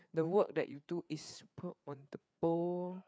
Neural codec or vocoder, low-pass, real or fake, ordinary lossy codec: codec, 16 kHz, 4 kbps, FreqCodec, larger model; none; fake; none